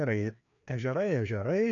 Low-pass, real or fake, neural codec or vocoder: 7.2 kHz; fake; codec, 16 kHz, 2 kbps, FreqCodec, larger model